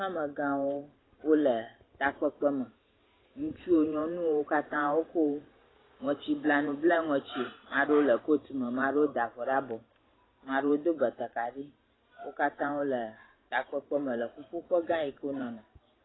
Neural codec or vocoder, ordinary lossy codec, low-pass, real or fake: vocoder, 44.1 kHz, 128 mel bands every 512 samples, BigVGAN v2; AAC, 16 kbps; 7.2 kHz; fake